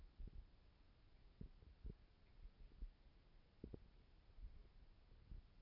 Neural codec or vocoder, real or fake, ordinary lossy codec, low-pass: none; real; none; 5.4 kHz